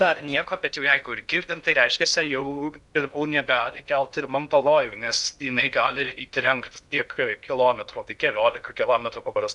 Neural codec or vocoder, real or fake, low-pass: codec, 16 kHz in and 24 kHz out, 0.6 kbps, FocalCodec, streaming, 2048 codes; fake; 10.8 kHz